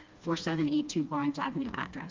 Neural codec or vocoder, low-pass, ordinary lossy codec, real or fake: codec, 16 kHz, 2 kbps, FreqCodec, smaller model; 7.2 kHz; Opus, 32 kbps; fake